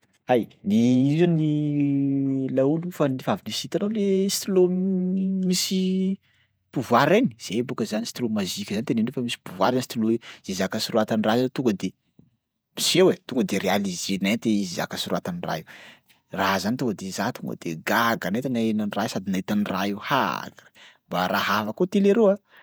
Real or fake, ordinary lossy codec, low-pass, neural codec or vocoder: fake; none; none; vocoder, 48 kHz, 128 mel bands, Vocos